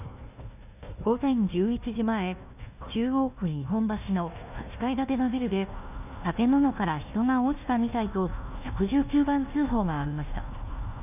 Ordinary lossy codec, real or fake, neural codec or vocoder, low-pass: none; fake; codec, 16 kHz, 1 kbps, FunCodec, trained on Chinese and English, 50 frames a second; 3.6 kHz